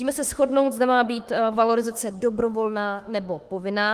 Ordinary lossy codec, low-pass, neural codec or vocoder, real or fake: Opus, 24 kbps; 14.4 kHz; autoencoder, 48 kHz, 32 numbers a frame, DAC-VAE, trained on Japanese speech; fake